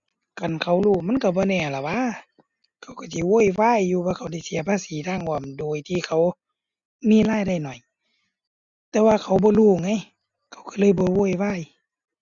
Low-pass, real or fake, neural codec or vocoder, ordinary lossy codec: 7.2 kHz; real; none; Opus, 64 kbps